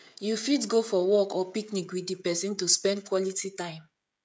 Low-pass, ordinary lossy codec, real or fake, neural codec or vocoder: none; none; fake; codec, 16 kHz, 16 kbps, FreqCodec, smaller model